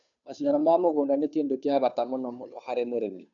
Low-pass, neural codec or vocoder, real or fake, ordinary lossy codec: 7.2 kHz; codec, 16 kHz, 2 kbps, FunCodec, trained on Chinese and English, 25 frames a second; fake; none